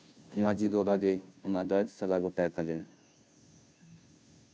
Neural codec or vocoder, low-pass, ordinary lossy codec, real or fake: codec, 16 kHz, 0.5 kbps, FunCodec, trained on Chinese and English, 25 frames a second; none; none; fake